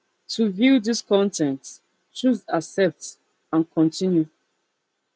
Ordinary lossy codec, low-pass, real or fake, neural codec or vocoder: none; none; real; none